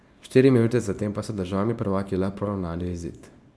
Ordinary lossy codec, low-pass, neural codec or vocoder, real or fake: none; none; codec, 24 kHz, 0.9 kbps, WavTokenizer, small release; fake